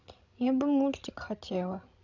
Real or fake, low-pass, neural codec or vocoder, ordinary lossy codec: fake; 7.2 kHz; codec, 16 kHz, 16 kbps, FreqCodec, larger model; none